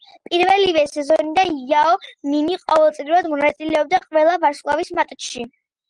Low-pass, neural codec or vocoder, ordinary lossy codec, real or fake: 9.9 kHz; none; Opus, 24 kbps; real